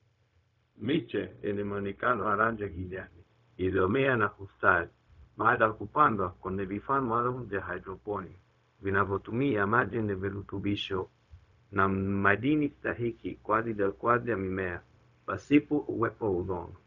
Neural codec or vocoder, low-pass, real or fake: codec, 16 kHz, 0.4 kbps, LongCat-Audio-Codec; 7.2 kHz; fake